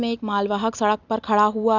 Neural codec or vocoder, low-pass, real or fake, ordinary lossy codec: none; 7.2 kHz; real; none